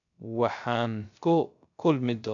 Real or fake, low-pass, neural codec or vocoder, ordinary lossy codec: fake; 7.2 kHz; codec, 16 kHz, 0.3 kbps, FocalCodec; MP3, 64 kbps